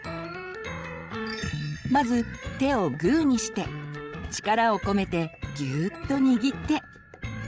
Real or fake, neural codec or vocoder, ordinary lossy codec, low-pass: fake; codec, 16 kHz, 16 kbps, FreqCodec, larger model; none; none